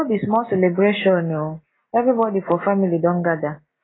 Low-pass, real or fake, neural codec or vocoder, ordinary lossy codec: 7.2 kHz; real; none; AAC, 16 kbps